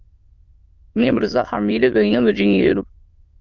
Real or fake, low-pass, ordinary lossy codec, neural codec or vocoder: fake; 7.2 kHz; Opus, 32 kbps; autoencoder, 22.05 kHz, a latent of 192 numbers a frame, VITS, trained on many speakers